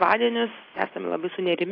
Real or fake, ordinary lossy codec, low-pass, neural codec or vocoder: real; AAC, 24 kbps; 5.4 kHz; none